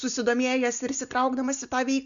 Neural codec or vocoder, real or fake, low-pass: none; real; 7.2 kHz